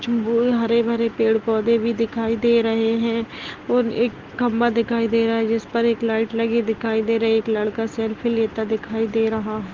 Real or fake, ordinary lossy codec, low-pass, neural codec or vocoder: real; Opus, 16 kbps; 7.2 kHz; none